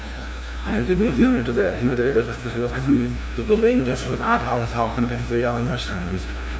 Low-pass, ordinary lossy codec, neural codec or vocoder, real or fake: none; none; codec, 16 kHz, 0.5 kbps, FunCodec, trained on LibriTTS, 25 frames a second; fake